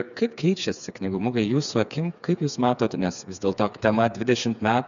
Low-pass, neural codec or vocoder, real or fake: 7.2 kHz; codec, 16 kHz, 4 kbps, FreqCodec, smaller model; fake